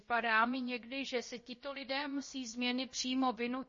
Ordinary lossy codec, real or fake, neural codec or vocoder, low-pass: MP3, 32 kbps; fake; codec, 16 kHz, about 1 kbps, DyCAST, with the encoder's durations; 7.2 kHz